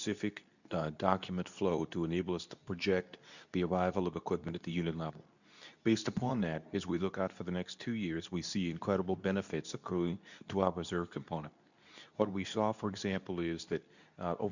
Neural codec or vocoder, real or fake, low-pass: codec, 24 kHz, 0.9 kbps, WavTokenizer, medium speech release version 2; fake; 7.2 kHz